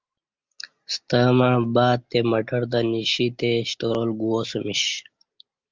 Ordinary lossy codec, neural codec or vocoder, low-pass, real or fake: Opus, 32 kbps; none; 7.2 kHz; real